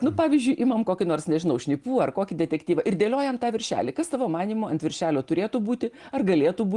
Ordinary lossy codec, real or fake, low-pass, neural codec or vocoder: Opus, 24 kbps; real; 10.8 kHz; none